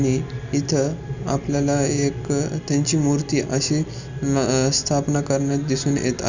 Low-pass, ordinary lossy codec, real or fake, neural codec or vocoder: 7.2 kHz; none; real; none